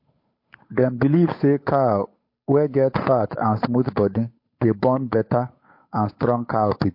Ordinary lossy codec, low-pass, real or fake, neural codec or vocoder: MP3, 32 kbps; 5.4 kHz; fake; codec, 16 kHz, 8 kbps, FunCodec, trained on Chinese and English, 25 frames a second